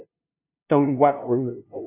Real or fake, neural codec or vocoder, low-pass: fake; codec, 16 kHz, 0.5 kbps, FunCodec, trained on LibriTTS, 25 frames a second; 3.6 kHz